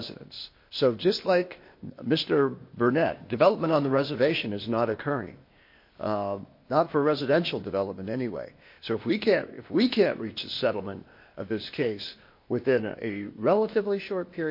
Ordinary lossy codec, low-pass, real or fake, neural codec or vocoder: MP3, 32 kbps; 5.4 kHz; fake; codec, 16 kHz, 0.8 kbps, ZipCodec